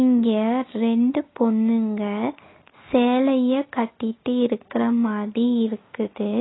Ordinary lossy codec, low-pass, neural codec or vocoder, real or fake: AAC, 16 kbps; 7.2 kHz; none; real